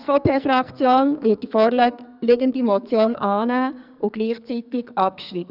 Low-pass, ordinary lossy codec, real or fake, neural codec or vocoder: 5.4 kHz; none; fake; codec, 44.1 kHz, 2.6 kbps, SNAC